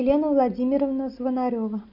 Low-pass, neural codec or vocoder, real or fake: 5.4 kHz; none; real